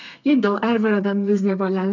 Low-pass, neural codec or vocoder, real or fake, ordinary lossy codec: 7.2 kHz; codec, 32 kHz, 1.9 kbps, SNAC; fake; none